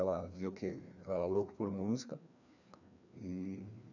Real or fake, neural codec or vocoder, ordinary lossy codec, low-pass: fake; codec, 16 kHz, 2 kbps, FreqCodec, larger model; none; 7.2 kHz